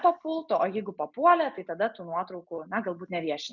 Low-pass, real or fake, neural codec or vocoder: 7.2 kHz; real; none